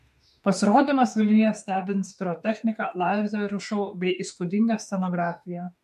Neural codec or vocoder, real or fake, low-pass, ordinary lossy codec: autoencoder, 48 kHz, 32 numbers a frame, DAC-VAE, trained on Japanese speech; fake; 14.4 kHz; MP3, 64 kbps